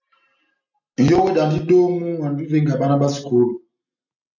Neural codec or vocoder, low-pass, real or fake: none; 7.2 kHz; real